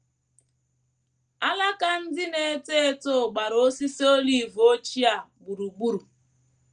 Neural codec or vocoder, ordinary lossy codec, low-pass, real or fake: none; Opus, 32 kbps; 9.9 kHz; real